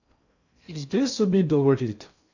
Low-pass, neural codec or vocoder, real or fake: 7.2 kHz; codec, 16 kHz in and 24 kHz out, 0.6 kbps, FocalCodec, streaming, 4096 codes; fake